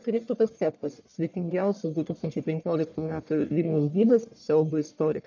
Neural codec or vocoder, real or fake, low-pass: codec, 44.1 kHz, 1.7 kbps, Pupu-Codec; fake; 7.2 kHz